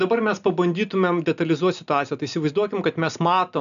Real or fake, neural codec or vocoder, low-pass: real; none; 7.2 kHz